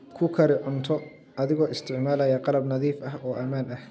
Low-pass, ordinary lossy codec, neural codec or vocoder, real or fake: none; none; none; real